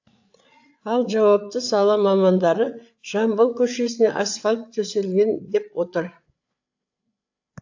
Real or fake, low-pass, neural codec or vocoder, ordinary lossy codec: fake; 7.2 kHz; codec, 16 kHz, 8 kbps, FreqCodec, larger model; AAC, 48 kbps